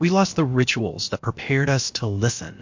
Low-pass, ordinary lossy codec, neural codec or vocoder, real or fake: 7.2 kHz; MP3, 48 kbps; codec, 16 kHz, about 1 kbps, DyCAST, with the encoder's durations; fake